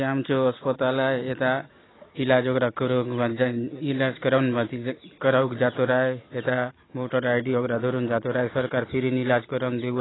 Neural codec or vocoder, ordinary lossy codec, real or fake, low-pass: none; AAC, 16 kbps; real; 7.2 kHz